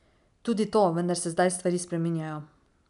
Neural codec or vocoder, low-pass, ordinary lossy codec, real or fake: none; 10.8 kHz; none; real